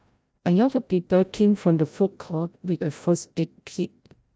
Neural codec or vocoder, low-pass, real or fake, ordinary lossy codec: codec, 16 kHz, 0.5 kbps, FreqCodec, larger model; none; fake; none